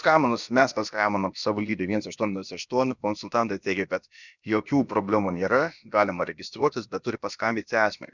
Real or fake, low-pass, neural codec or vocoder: fake; 7.2 kHz; codec, 16 kHz, about 1 kbps, DyCAST, with the encoder's durations